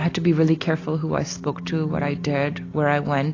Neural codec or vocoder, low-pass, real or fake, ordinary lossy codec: none; 7.2 kHz; real; AAC, 32 kbps